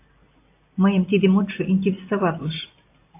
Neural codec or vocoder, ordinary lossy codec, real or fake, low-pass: none; AAC, 24 kbps; real; 3.6 kHz